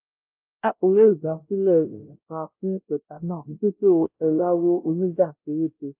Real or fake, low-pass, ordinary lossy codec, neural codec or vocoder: fake; 3.6 kHz; Opus, 24 kbps; codec, 16 kHz, 0.5 kbps, X-Codec, WavLM features, trained on Multilingual LibriSpeech